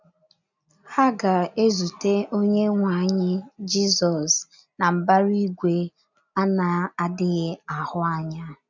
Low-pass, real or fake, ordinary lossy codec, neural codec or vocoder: 7.2 kHz; real; none; none